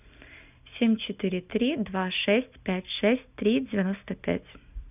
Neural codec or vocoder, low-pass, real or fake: none; 3.6 kHz; real